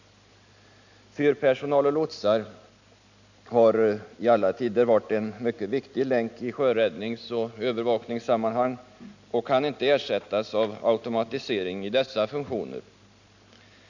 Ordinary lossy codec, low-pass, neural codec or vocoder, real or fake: none; 7.2 kHz; none; real